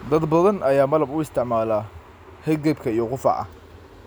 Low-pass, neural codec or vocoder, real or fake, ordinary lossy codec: none; none; real; none